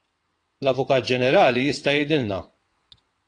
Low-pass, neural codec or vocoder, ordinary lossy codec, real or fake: 9.9 kHz; vocoder, 22.05 kHz, 80 mel bands, WaveNeXt; AAC, 48 kbps; fake